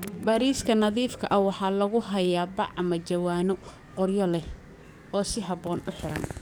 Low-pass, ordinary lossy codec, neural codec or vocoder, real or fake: none; none; codec, 44.1 kHz, 7.8 kbps, DAC; fake